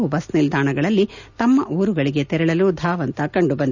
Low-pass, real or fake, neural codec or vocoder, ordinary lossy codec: 7.2 kHz; real; none; none